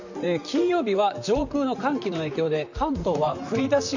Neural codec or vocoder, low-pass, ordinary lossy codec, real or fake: vocoder, 44.1 kHz, 128 mel bands, Pupu-Vocoder; 7.2 kHz; none; fake